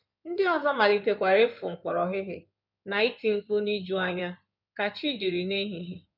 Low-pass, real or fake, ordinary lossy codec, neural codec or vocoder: 5.4 kHz; fake; none; codec, 16 kHz in and 24 kHz out, 2.2 kbps, FireRedTTS-2 codec